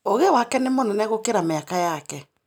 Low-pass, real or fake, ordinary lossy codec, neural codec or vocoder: none; real; none; none